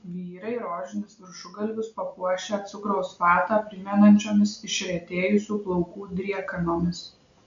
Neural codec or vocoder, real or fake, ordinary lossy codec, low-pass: none; real; MP3, 64 kbps; 7.2 kHz